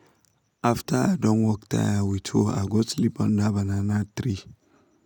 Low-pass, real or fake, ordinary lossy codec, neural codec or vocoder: 19.8 kHz; real; none; none